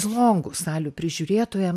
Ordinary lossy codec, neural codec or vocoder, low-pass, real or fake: MP3, 96 kbps; none; 14.4 kHz; real